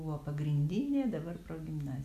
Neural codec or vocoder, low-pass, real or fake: none; 14.4 kHz; real